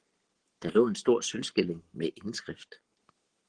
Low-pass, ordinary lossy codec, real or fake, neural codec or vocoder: 9.9 kHz; Opus, 16 kbps; fake; codec, 44.1 kHz, 7.8 kbps, Pupu-Codec